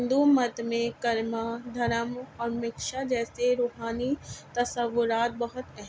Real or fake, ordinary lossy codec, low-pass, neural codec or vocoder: real; none; none; none